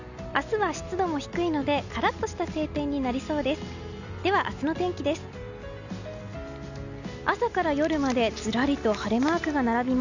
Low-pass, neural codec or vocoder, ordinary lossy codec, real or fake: 7.2 kHz; none; none; real